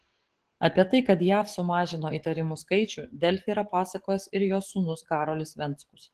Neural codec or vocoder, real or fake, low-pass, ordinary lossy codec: codec, 44.1 kHz, 7.8 kbps, DAC; fake; 14.4 kHz; Opus, 16 kbps